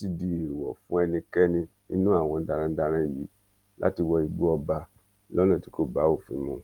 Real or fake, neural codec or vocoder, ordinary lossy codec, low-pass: real; none; Opus, 24 kbps; 19.8 kHz